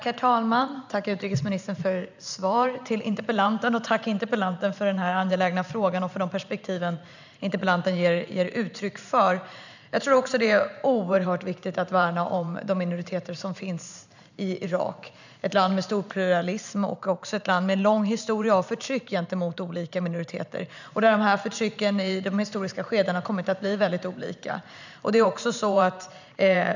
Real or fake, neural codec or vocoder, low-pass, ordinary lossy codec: fake; vocoder, 44.1 kHz, 128 mel bands every 512 samples, BigVGAN v2; 7.2 kHz; none